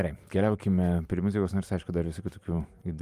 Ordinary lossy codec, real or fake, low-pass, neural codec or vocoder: Opus, 32 kbps; fake; 14.4 kHz; vocoder, 44.1 kHz, 128 mel bands every 512 samples, BigVGAN v2